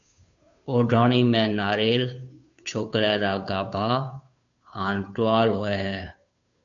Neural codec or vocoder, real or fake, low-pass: codec, 16 kHz, 2 kbps, FunCodec, trained on Chinese and English, 25 frames a second; fake; 7.2 kHz